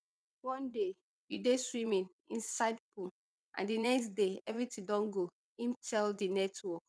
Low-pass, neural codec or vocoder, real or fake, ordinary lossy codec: none; vocoder, 22.05 kHz, 80 mel bands, WaveNeXt; fake; none